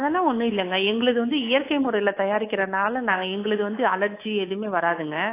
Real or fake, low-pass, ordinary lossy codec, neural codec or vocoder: fake; 3.6 kHz; AAC, 24 kbps; codec, 24 kHz, 3.1 kbps, DualCodec